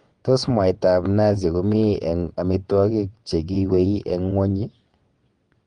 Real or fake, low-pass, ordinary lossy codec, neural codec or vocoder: fake; 9.9 kHz; Opus, 24 kbps; vocoder, 22.05 kHz, 80 mel bands, WaveNeXt